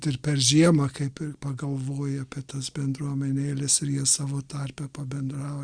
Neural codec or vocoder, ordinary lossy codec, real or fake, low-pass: none; Opus, 64 kbps; real; 9.9 kHz